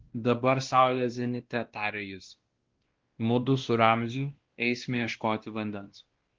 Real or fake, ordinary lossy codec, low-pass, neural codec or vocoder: fake; Opus, 16 kbps; 7.2 kHz; codec, 16 kHz, 1 kbps, X-Codec, WavLM features, trained on Multilingual LibriSpeech